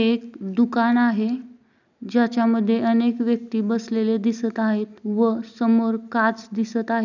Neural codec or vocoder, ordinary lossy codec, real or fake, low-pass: none; none; real; 7.2 kHz